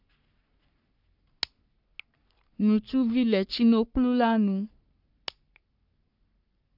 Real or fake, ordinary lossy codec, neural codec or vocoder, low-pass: fake; none; codec, 44.1 kHz, 3.4 kbps, Pupu-Codec; 5.4 kHz